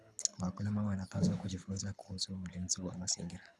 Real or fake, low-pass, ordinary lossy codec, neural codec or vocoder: fake; none; none; codec, 24 kHz, 6 kbps, HILCodec